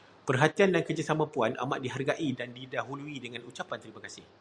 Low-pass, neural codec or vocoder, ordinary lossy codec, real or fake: 9.9 kHz; none; Opus, 64 kbps; real